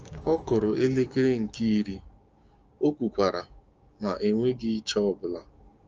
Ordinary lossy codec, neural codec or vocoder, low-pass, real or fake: Opus, 24 kbps; codec, 16 kHz, 6 kbps, DAC; 7.2 kHz; fake